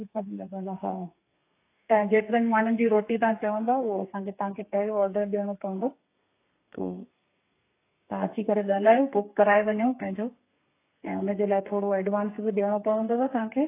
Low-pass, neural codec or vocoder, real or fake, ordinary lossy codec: 3.6 kHz; codec, 32 kHz, 1.9 kbps, SNAC; fake; AAC, 24 kbps